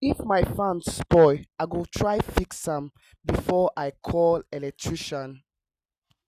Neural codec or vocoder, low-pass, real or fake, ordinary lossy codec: none; 14.4 kHz; real; Opus, 64 kbps